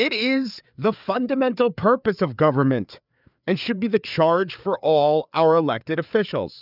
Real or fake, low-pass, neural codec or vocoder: fake; 5.4 kHz; codec, 16 kHz, 4 kbps, FreqCodec, larger model